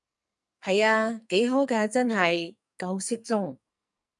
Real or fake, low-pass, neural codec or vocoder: fake; 10.8 kHz; codec, 44.1 kHz, 2.6 kbps, SNAC